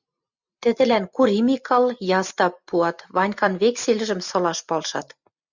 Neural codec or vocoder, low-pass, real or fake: none; 7.2 kHz; real